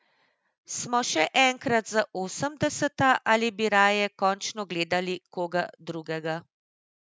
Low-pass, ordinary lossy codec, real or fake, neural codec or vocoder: none; none; real; none